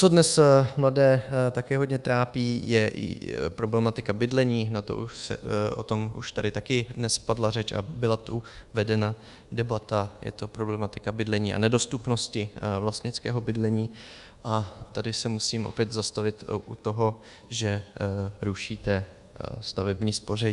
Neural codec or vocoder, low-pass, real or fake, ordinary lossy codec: codec, 24 kHz, 1.2 kbps, DualCodec; 10.8 kHz; fake; Opus, 64 kbps